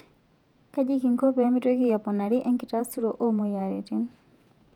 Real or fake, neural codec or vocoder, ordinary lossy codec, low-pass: fake; vocoder, 48 kHz, 128 mel bands, Vocos; none; 19.8 kHz